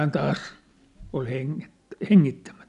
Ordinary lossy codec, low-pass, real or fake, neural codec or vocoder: none; 10.8 kHz; real; none